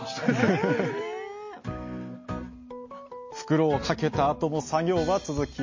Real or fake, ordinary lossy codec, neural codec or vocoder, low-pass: real; MP3, 32 kbps; none; 7.2 kHz